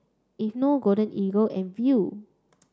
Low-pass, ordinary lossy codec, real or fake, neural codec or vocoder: none; none; real; none